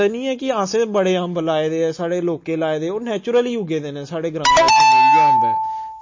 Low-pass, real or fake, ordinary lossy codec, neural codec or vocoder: 7.2 kHz; real; MP3, 32 kbps; none